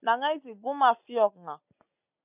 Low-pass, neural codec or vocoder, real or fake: 3.6 kHz; none; real